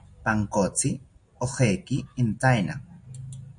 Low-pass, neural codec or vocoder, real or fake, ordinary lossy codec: 9.9 kHz; none; real; MP3, 48 kbps